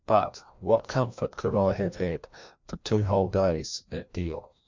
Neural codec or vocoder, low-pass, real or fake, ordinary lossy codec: codec, 16 kHz, 1 kbps, FreqCodec, larger model; 7.2 kHz; fake; MP3, 64 kbps